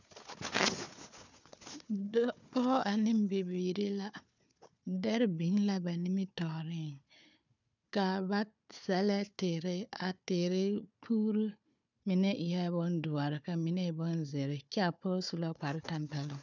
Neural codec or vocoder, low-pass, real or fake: codec, 16 kHz, 4 kbps, FunCodec, trained on Chinese and English, 50 frames a second; 7.2 kHz; fake